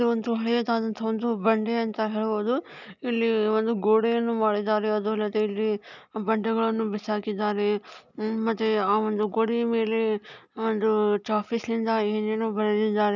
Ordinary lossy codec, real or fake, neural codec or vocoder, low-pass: none; real; none; 7.2 kHz